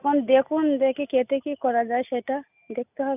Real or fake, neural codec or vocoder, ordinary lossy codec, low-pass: real; none; none; 3.6 kHz